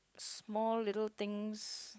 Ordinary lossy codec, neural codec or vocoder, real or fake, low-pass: none; codec, 16 kHz, 16 kbps, FunCodec, trained on LibriTTS, 50 frames a second; fake; none